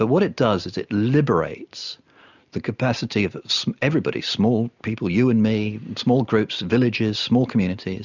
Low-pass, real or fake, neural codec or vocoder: 7.2 kHz; real; none